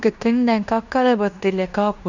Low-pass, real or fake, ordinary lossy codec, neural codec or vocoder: 7.2 kHz; fake; none; codec, 16 kHz in and 24 kHz out, 0.9 kbps, LongCat-Audio-Codec, fine tuned four codebook decoder